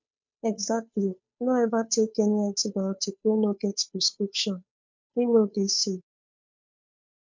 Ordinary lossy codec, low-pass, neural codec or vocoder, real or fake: MP3, 48 kbps; 7.2 kHz; codec, 16 kHz, 2 kbps, FunCodec, trained on Chinese and English, 25 frames a second; fake